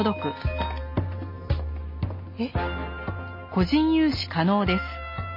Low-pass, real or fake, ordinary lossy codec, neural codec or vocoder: 5.4 kHz; real; MP3, 24 kbps; none